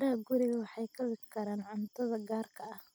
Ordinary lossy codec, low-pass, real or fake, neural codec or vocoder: none; none; real; none